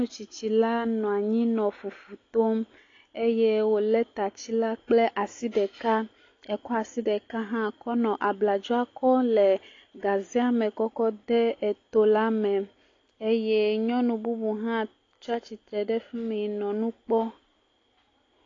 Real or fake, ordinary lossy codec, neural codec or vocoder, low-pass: real; AAC, 32 kbps; none; 7.2 kHz